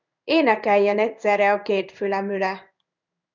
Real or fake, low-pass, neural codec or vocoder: fake; 7.2 kHz; codec, 16 kHz in and 24 kHz out, 1 kbps, XY-Tokenizer